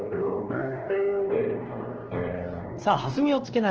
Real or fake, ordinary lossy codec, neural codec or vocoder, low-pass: fake; Opus, 16 kbps; codec, 16 kHz, 2 kbps, X-Codec, WavLM features, trained on Multilingual LibriSpeech; 7.2 kHz